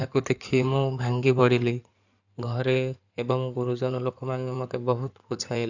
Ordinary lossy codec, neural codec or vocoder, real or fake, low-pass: none; codec, 16 kHz in and 24 kHz out, 2.2 kbps, FireRedTTS-2 codec; fake; 7.2 kHz